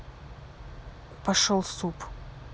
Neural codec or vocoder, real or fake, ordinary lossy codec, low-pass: none; real; none; none